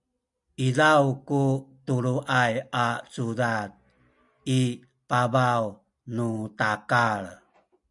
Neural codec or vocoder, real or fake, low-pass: none; real; 10.8 kHz